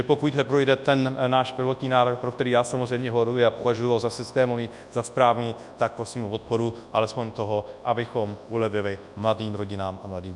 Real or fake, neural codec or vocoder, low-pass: fake; codec, 24 kHz, 0.9 kbps, WavTokenizer, large speech release; 10.8 kHz